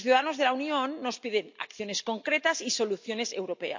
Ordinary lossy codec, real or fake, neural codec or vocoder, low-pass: MP3, 48 kbps; real; none; 7.2 kHz